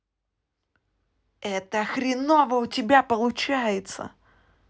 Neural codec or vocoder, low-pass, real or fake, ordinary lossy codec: none; none; real; none